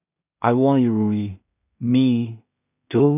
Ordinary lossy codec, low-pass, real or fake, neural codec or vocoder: none; 3.6 kHz; fake; codec, 16 kHz in and 24 kHz out, 0.4 kbps, LongCat-Audio-Codec, two codebook decoder